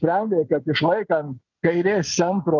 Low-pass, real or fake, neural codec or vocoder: 7.2 kHz; real; none